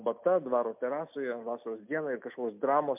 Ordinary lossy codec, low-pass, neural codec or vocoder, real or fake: MP3, 32 kbps; 3.6 kHz; none; real